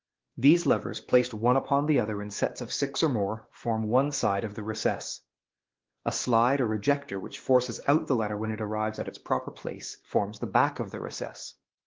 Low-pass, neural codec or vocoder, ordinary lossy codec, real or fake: 7.2 kHz; codec, 16 kHz, 2 kbps, X-Codec, WavLM features, trained on Multilingual LibriSpeech; Opus, 16 kbps; fake